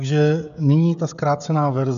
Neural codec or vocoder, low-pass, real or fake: codec, 16 kHz, 16 kbps, FreqCodec, smaller model; 7.2 kHz; fake